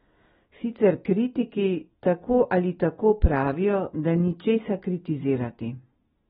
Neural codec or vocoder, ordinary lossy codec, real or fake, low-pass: none; AAC, 16 kbps; real; 19.8 kHz